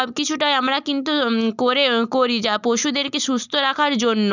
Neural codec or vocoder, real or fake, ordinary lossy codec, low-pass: none; real; none; 7.2 kHz